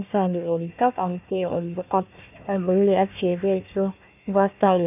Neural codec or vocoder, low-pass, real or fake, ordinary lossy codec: codec, 16 kHz, 1 kbps, FunCodec, trained on Chinese and English, 50 frames a second; 3.6 kHz; fake; none